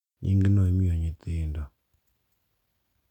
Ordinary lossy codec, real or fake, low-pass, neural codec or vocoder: none; real; 19.8 kHz; none